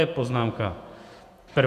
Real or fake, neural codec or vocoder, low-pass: fake; vocoder, 48 kHz, 128 mel bands, Vocos; 14.4 kHz